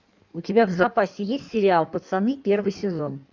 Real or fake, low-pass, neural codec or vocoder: fake; 7.2 kHz; codec, 16 kHz in and 24 kHz out, 1.1 kbps, FireRedTTS-2 codec